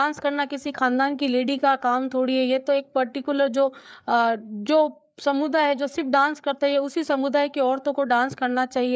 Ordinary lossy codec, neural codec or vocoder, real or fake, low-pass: none; codec, 16 kHz, 4 kbps, FreqCodec, larger model; fake; none